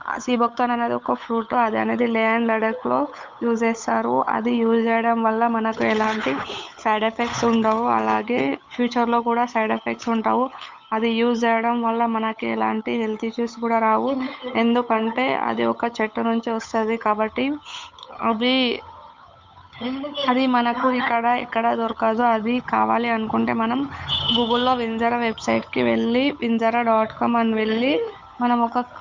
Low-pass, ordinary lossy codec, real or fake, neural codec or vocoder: 7.2 kHz; MP3, 64 kbps; fake; codec, 16 kHz, 8 kbps, FunCodec, trained on Chinese and English, 25 frames a second